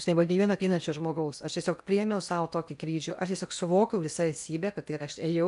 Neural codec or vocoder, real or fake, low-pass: codec, 16 kHz in and 24 kHz out, 0.8 kbps, FocalCodec, streaming, 65536 codes; fake; 10.8 kHz